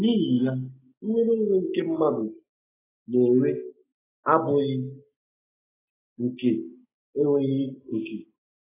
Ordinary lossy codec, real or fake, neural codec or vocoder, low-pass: AAC, 16 kbps; real; none; 3.6 kHz